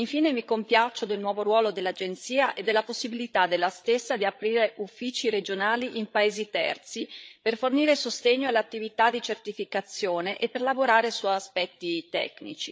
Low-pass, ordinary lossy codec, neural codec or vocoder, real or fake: none; none; codec, 16 kHz, 8 kbps, FreqCodec, larger model; fake